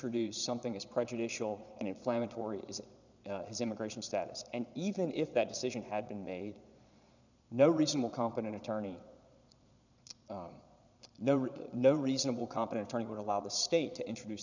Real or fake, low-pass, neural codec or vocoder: fake; 7.2 kHz; vocoder, 22.05 kHz, 80 mel bands, Vocos